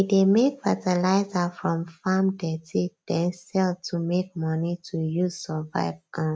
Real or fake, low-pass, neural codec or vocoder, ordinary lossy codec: real; none; none; none